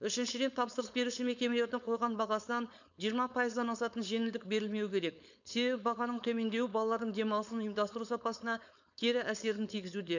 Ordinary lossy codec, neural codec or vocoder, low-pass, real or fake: none; codec, 16 kHz, 4.8 kbps, FACodec; 7.2 kHz; fake